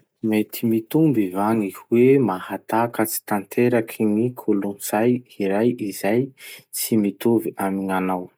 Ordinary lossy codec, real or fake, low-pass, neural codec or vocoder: none; real; none; none